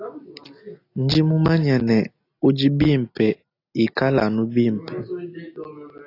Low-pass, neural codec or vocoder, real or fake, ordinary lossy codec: 5.4 kHz; none; real; AAC, 32 kbps